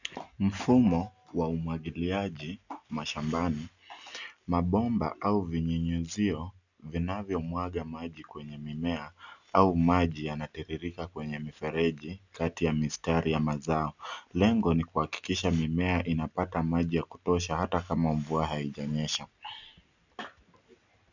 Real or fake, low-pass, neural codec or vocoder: real; 7.2 kHz; none